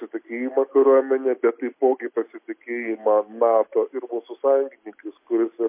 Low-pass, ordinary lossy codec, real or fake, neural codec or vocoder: 3.6 kHz; MP3, 24 kbps; real; none